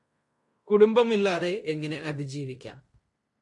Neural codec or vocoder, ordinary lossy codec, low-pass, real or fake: codec, 16 kHz in and 24 kHz out, 0.9 kbps, LongCat-Audio-Codec, fine tuned four codebook decoder; MP3, 48 kbps; 10.8 kHz; fake